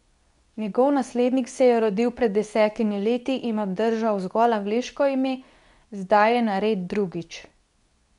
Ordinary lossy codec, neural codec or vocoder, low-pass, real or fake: none; codec, 24 kHz, 0.9 kbps, WavTokenizer, medium speech release version 2; 10.8 kHz; fake